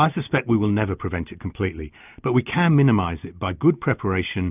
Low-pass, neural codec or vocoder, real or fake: 3.6 kHz; none; real